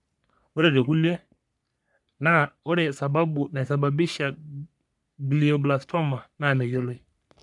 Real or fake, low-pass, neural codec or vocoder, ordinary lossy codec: fake; 10.8 kHz; codec, 44.1 kHz, 3.4 kbps, Pupu-Codec; none